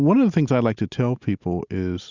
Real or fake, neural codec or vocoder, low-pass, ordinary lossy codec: real; none; 7.2 kHz; Opus, 64 kbps